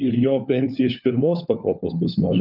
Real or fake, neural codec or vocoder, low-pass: fake; codec, 16 kHz, 4 kbps, FunCodec, trained on LibriTTS, 50 frames a second; 5.4 kHz